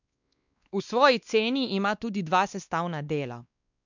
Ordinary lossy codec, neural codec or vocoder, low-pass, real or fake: none; codec, 16 kHz, 2 kbps, X-Codec, WavLM features, trained on Multilingual LibriSpeech; 7.2 kHz; fake